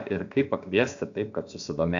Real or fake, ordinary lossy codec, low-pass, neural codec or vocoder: fake; AAC, 64 kbps; 7.2 kHz; codec, 16 kHz, about 1 kbps, DyCAST, with the encoder's durations